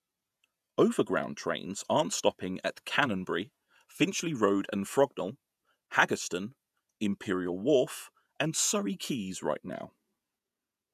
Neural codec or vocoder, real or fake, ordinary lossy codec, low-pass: vocoder, 48 kHz, 128 mel bands, Vocos; fake; none; 14.4 kHz